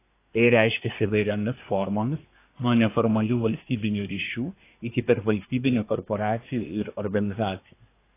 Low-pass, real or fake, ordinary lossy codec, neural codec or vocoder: 3.6 kHz; fake; AAC, 24 kbps; codec, 24 kHz, 1 kbps, SNAC